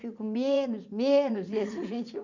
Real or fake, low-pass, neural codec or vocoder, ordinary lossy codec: fake; 7.2 kHz; vocoder, 44.1 kHz, 80 mel bands, Vocos; none